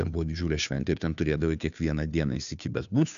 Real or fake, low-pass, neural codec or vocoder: fake; 7.2 kHz; codec, 16 kHz, 2 kbps, FunCodec, trained on Chinese and English, 25 frames a second